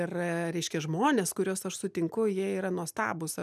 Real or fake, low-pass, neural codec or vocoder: real; 14.4 kHz; none